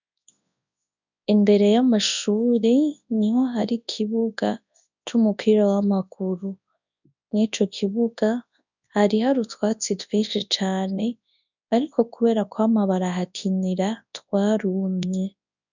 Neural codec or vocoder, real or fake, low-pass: codec, 24 kHz, 0.9 kbps, WavTokenizer, large speech release; fake; 7.2 kHz